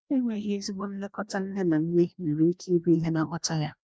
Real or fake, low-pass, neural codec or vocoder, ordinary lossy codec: fake; none; codec, 16 kHz, 1 kbps, FreqCodec, larger model; none